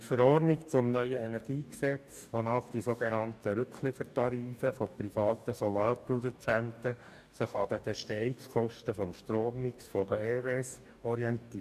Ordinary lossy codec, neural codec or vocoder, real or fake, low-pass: none; codec, 44.1 kHz, 2.6 kbps, DAC; fake; 14.4 kHz